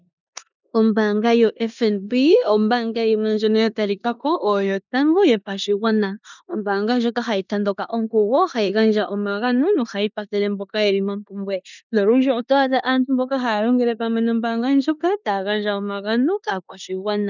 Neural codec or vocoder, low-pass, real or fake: codec, 16 kHz in and 24 kHz out, 0.9 kbps, LongCat-Audio-Codec, four codebook decoder; 7.2 kHz; fake